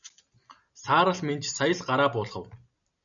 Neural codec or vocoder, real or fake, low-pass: none; real; 7.2 kHz